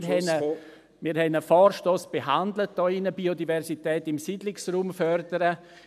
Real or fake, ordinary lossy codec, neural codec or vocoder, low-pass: real; none; none; 14.4 kHz